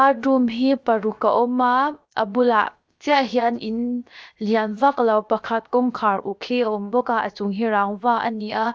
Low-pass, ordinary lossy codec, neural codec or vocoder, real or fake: none; none; codec, 16 kHz, 0.7 kbps, FocalCodec; fake